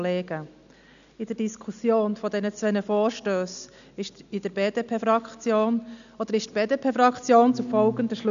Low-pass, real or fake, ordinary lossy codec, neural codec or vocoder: 7.2 kHz; real; none; none